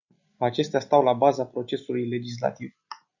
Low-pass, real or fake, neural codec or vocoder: 7.2 kHz; real; none